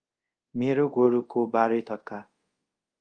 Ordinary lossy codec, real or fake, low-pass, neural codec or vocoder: Opus, 24 kbps; fake; 9.9 kHz; codec, 24 kHz, 0.5 kbps, DualCodec